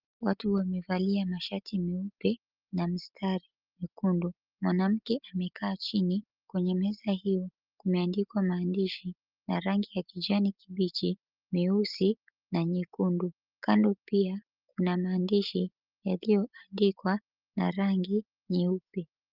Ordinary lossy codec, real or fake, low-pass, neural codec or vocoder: Opus, 32 kbps; real; 5.4 kHz; none